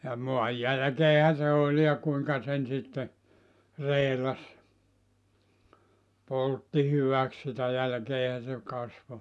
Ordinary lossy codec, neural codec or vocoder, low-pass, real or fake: none; none; none; real